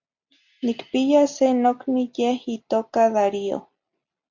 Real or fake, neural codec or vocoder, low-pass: real; none; 7.2 kHz